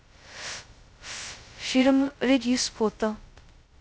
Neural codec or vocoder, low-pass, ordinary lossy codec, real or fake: codec, 16 kHz, 0.2 kbps, FocalCodec; none; none; fake